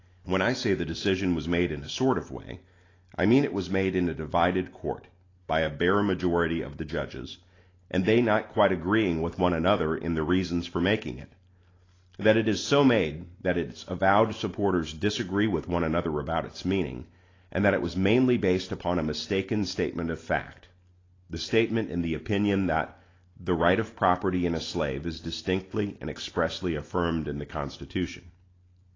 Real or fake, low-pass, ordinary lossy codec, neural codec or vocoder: real; 7.2 kHz; AAC, 32 kbps; none